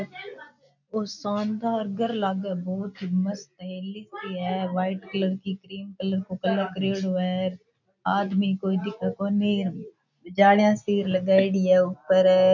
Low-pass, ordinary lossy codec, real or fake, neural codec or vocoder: 7.2 kHz; none; real; none